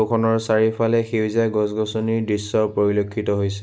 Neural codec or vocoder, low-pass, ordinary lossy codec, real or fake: none; none; none; real